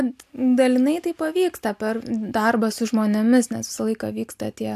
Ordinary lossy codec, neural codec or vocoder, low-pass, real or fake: AAC, 96 kbps; none; 14.4 kHz; real